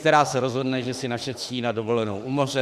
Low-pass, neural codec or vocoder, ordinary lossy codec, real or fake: 14.4 kHz; autoencoder, 48 kHz, 32 numbers a frame, DAC-VAE, trained on Japanese speech; Opus, 64 kbps; fake